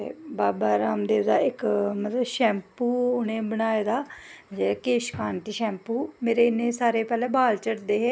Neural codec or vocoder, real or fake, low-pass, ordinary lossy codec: none; real; none; none